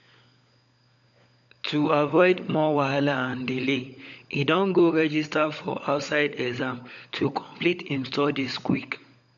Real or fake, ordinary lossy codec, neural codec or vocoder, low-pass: fake; none; codec, 16 kHz, 16 kbps, FunCodec, trained on LibriTTS, 50 frames a second; 7.2 kHz